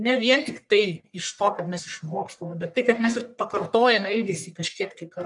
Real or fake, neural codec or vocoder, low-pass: fake; codec, 44.1 kHz, 1.7 kbps, Pupu-Codec; 10.8 kHz